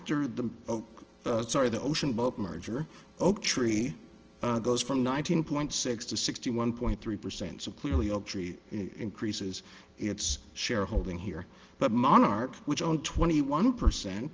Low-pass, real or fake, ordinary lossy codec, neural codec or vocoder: 7.2 kHz; real; Opus, 16 kbps; none